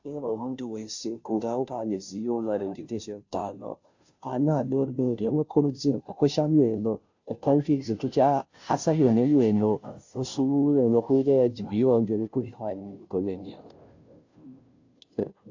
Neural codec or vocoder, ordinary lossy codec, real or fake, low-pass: codec, 16 kHz, 0.5 kbps, FunCodec, trained on Chinese and English, 25 frames a second; AAC, 48 kbps; fake; 7.2 kHz